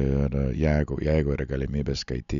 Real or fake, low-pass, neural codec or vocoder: real; 7.2 kHz; none